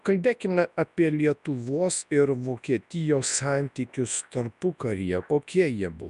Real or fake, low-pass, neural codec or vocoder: fake; 10.8 kHz; codec, 24 kHz, 0.9 kbps, WavTokenizer, large speech release